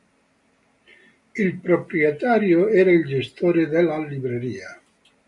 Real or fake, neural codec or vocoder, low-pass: real; none; 10.8 kHz